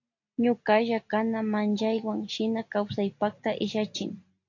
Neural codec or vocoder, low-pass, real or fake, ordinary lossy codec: none; 7.2 kHz; real; AAC, 48 kbps